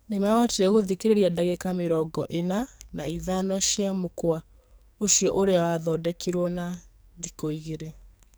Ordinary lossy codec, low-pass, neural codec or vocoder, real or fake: none; none; codec, 44.1 kHz, 2.6 kbps, SNAC; fake